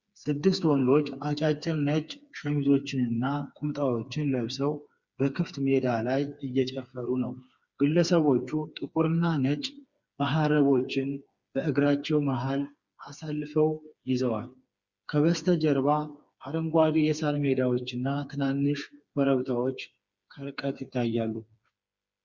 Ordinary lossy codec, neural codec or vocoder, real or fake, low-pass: Opus, 64 kbps; codec, 16 kHz, 4 kbps, FreqCodec, smaller model; fake; 7.2 kHz